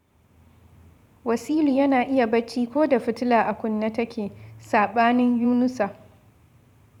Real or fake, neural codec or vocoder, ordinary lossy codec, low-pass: fake; vocoder, 44.1 kHz, 128 mel bands every 512 samples, BigVGAN v2; none; 19.8 kHz